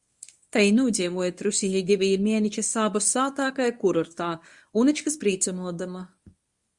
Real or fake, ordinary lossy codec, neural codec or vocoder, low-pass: fake; Opus, 64 kbps; codec, 24 kHz, 0.9 kbps, WavTokenizer, medium speech release version 1; 10.8 kHz